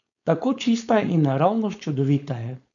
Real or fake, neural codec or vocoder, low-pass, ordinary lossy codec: fake; codec, 16 kHz, 4.8 kbps, FACodec; 7.2 kHz; none